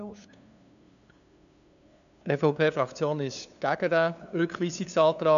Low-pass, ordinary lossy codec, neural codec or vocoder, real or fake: 7.2 kHz; none; codec, 16 kHz, 2 kbps, FunCodec, trained on LibriTTS, 25 frames a second; fake